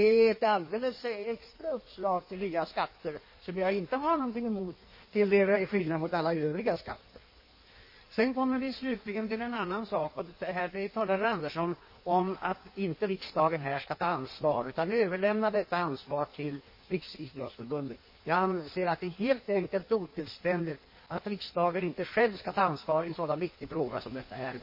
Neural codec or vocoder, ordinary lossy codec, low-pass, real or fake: codec, 16 kHz in and 24 kHz out, 1.1 kbps, FireRedTTS-2 codec; MP3, 24 kbps; 5.4 kHz; fake